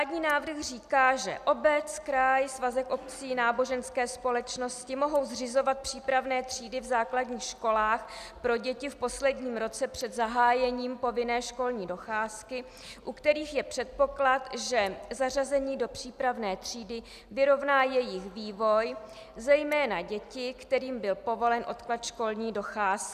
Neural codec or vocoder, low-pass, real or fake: none; 14.4 kHz; real